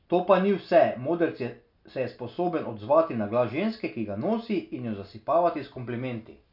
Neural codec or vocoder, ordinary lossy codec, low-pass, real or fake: none; none; 5.4 kHz; real